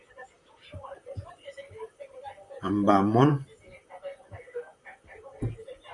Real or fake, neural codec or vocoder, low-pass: fake; vocoder, 44.1 kHz, 128 mel bands, Pupu-Vocoder; 10.8 kHz